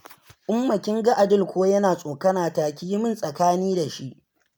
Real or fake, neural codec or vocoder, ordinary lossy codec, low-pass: real; none; none; none